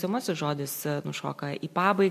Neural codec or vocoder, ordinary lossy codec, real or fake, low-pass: none; MP3, 64 kbps; real; 14.4 kHz